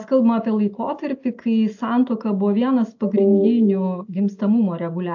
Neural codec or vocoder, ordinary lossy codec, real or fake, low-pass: none; AAC, 48 kbps; real; 7.2 kHz